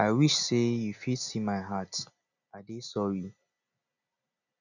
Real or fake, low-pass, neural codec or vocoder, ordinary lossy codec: real; 7.2 kHz; none; none